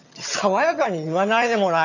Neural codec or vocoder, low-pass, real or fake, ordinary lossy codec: vocoder, 22.05 kHz, 80 mel bands, HiFi-GAN; 7.2 kHz; fake; none